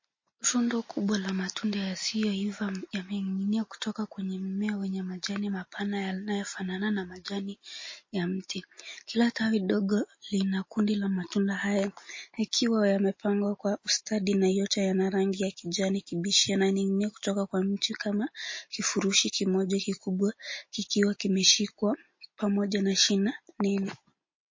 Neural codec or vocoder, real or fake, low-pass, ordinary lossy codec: none; real; 7.2 kHz; MP3, 32 kbps